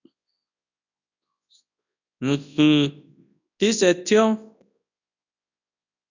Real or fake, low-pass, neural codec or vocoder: fake; 7.2 kHz; codec, 24 kHz, 0.9 kbps, WavTokenizer, large speech release